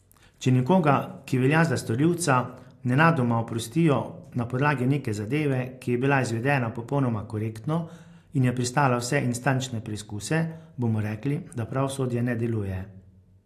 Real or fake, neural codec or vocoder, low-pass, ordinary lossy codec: real; none; 14.4 kHz; AAC, 64 kbps